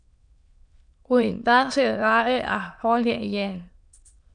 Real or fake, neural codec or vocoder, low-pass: fake; autoencoder, 22.05 kHz, a latent of 192 numbers a frame, VITS, trained on many speakers; 9.9 kHz